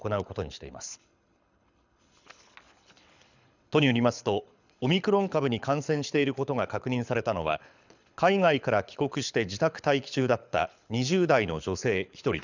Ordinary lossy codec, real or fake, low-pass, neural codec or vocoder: none; fake; 7.2 kHz; codec, 24 kHz, 6 kbps, HILCodec